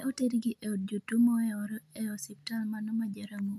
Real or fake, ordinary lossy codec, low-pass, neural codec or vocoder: real; none; 14.4 kHz; none